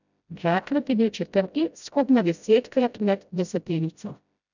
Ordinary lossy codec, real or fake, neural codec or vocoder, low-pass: none; fake; codec, 16 kHz, 0.5 kbps, FreqCodec, smaller model; 7.2 kHz